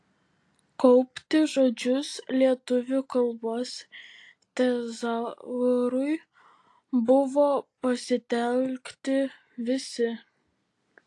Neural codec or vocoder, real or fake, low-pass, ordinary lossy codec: none; real; 10.8 kHz; AAC, 48 kbps